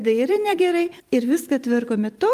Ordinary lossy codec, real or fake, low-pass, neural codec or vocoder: Opus, 32 kbps; fake; 14.4 kHz; vocoder, 44.1 kHz, 128 mel bands every 512 samples, BigVGAN v2